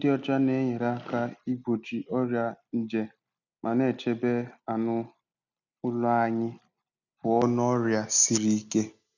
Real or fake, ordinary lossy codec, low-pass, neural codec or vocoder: real; none; 7.2 kHz; none